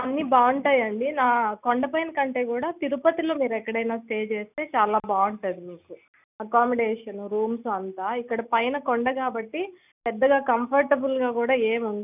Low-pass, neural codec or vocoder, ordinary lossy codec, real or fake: 3.6 kHz; none; none; real